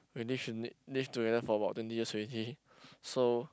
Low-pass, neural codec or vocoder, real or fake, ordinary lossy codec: none; none; real; none